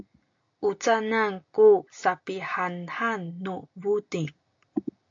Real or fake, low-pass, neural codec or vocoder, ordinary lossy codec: real; 7.2 kHz; none; AAC, 48 kbps